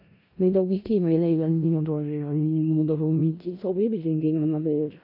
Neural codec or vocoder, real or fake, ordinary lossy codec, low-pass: codec, 16 kHz in and 24 kHz out, 0.4 kbps, LongCat-Audio-Codec, four codebook decoder; fake; AAC, 48 kbps; 5.4 kHz